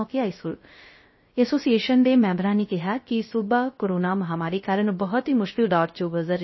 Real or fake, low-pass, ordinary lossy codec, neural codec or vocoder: fake; 7.2 kHz; MP3, 24 kbps; codec, 16 kHz, 0.3 kbps, FocalCodec